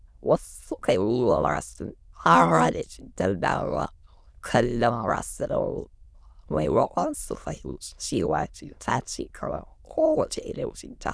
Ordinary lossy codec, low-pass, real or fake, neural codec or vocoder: none; none; fake; autoencoder, 22.05 kHz, a latent of 192 numbers a frame, VITS, trained on many speakers